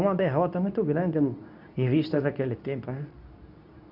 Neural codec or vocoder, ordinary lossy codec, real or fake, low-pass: codec, 24 kHz, 0.9 kbps, WavTokenizer, medium speech release version 2; AAC, 48 kbps; fake; 5.4 kHz